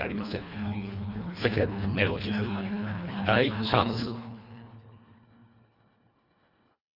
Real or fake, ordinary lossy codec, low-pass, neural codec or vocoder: fake; none; 5.4 kHz; codec, 24 kHz, 1.5 kbps, HILCodec